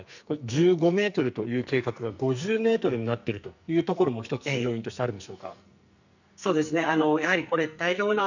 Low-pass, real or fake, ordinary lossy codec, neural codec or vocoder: 7.2 kHz; fake; none; codec, 44.1 kHz, 2.6 kbps, SNAC